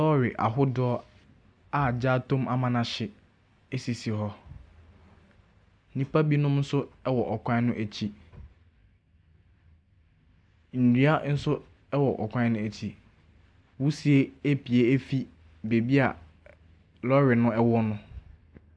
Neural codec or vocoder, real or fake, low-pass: none; real; 9.9 kHz